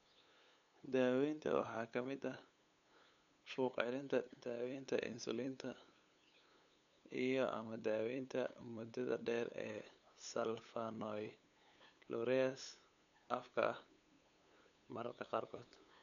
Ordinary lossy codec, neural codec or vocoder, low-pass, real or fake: none; codec, 16 kHz, 8 kbps, FunCodec, trained on LibriTTS, 25 frames a second; 7.2 kHz; fake